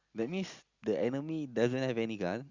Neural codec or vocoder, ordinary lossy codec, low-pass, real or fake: none; Opus, 64 kbps; 7.2 kHz; real